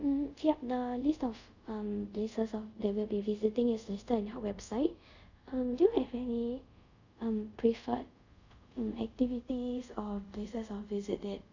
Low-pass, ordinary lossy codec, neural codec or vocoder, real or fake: 7.2 kHz; none; codec, 24 kHz, 0.5 kbps, DualCodec; fake